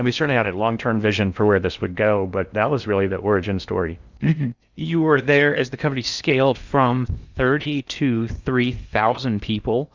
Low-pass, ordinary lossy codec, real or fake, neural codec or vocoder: 7.2 kHz; Opus, 64 kbps; fake; codec, 16 kHz in and 24 kHz out, 0.8 kbps, FocalCodec, streaming, 65536 codes